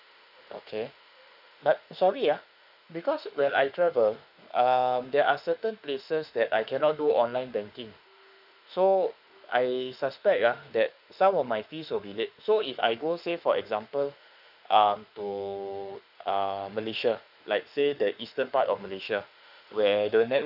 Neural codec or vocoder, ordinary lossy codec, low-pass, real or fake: autoencoder, 48 kHz, 32 numbers a frame, DAC-VAE, trained on Japanese speech; none; 5.4 kHz; fake